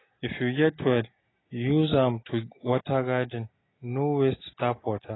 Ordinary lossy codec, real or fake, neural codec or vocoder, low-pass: AAC, 16 kbps; real; none; 7.2 kHz